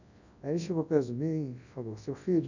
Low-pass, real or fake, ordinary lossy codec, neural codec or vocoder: 7.2 kHz; fake; none; codec, 24 kHz, 0.9 kbps, WavTokenizer, large speech release